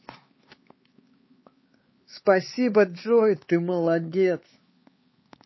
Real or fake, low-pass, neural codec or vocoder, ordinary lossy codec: fake; 7.2 kHz; codec, 16 kHz, 4 kbps, X-Codec, HuBERT features, trained on LibriSpeech; MP3, 24 kbps